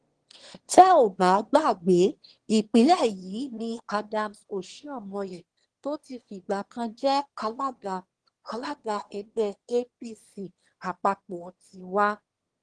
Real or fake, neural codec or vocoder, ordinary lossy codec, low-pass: fake; autoencoder, 22.05 kHz, a latent of 192 numbers a frame, VITS, trained on one speaker; Opus, 16 kbps; 9.9 kHz